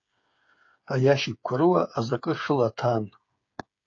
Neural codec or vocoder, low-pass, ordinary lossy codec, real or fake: codec, 16 kHz, 8 kbps, FreqCodec, smaller model; 7.2 kHz; AAC, 48 kbps; fake